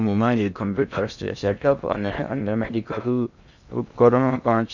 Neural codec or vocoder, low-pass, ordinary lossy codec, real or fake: codec, 16 kHz in and 24 kHz out, 0.6 kbps, FocalCodec, streaming, 2048 codes; 7.2 kHz; none; fake